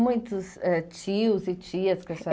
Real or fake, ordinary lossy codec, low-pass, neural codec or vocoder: real; none; none; none